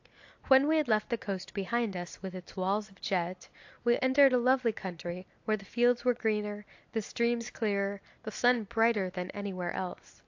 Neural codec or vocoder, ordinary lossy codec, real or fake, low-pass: none; AAC, 48 kbps; real; 7.2 kHz